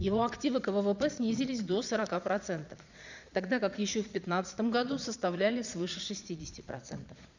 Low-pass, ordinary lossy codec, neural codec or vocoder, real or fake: 7.2 kHz; none; vocoder, 22.05 kHz, 80 mel bands, Vocos; fake